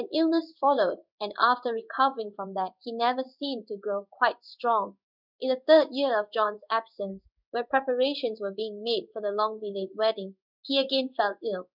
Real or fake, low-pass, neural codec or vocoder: fake; 5.4 kHz; codec, 16 kHz in and 24 kHz out, 1 kbps, XY-Tokenizer